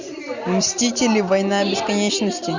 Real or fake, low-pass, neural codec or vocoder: real; 7.2 kHz; none